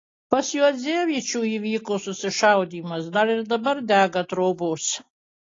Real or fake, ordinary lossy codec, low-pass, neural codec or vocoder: real; AAC, 32 kbps; 7.2 kHz; none